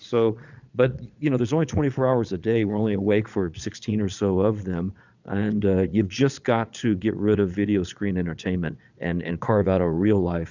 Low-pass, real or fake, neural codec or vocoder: 7.2 kHz; fake; vocoder, 22.05 kHz, 80 mel bands, Vocos